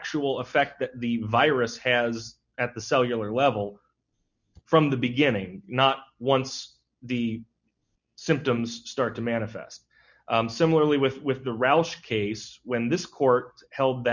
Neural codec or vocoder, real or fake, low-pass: none; real; 7.2 kHz